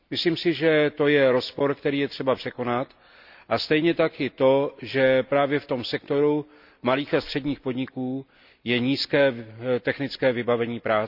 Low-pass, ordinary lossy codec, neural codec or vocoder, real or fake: 5.4 kHz; none; none; real